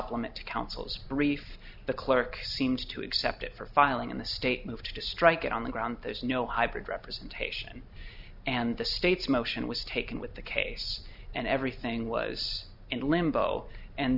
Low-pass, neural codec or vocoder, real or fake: 5.4 kHz; none; real